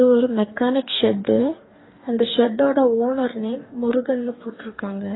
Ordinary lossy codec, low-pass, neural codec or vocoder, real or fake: AAC, 16 kbps; 7.2 kHz; codec, 44.1 kHz, 2.6 kbps, DAC; fake